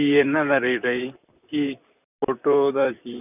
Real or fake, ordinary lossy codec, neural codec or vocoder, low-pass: fake; none; vocoder, 44.1 kHz, 128 mel bands, Pupu-Vocoder; 3.6 kHz